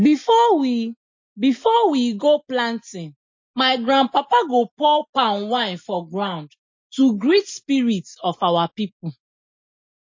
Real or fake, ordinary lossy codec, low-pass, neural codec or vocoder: real; MP3, 32 kbps; 7.2 kHz; none